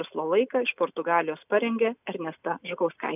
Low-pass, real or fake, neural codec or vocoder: 3.6 kHz; real; none